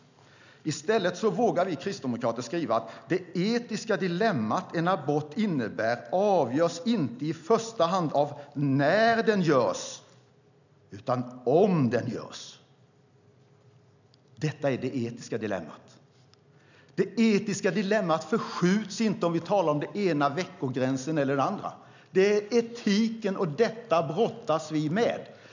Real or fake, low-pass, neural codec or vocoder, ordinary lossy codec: real; 7.2 kHz; none; none